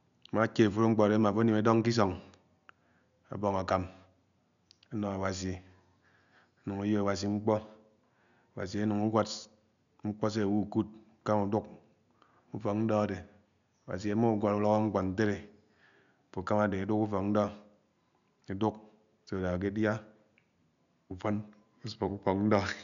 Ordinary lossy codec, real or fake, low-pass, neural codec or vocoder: none; real; 7.2 kHz; none